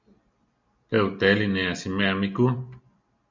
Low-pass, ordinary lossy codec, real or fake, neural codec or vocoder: 7.2 kHz; MP3, 64 kbps; real; none